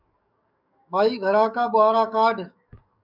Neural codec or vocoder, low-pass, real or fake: codec, 44.1 kHz, 7.8 kbps, DAC; 5.4 kHz; fake